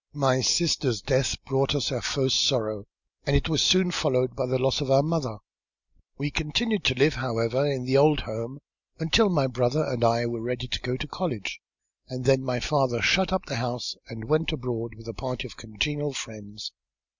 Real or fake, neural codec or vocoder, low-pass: real; none; 7.2 kHz